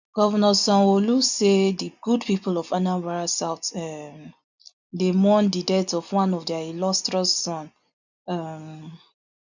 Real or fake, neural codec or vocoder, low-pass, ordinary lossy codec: real; none; 7.2 kHz; none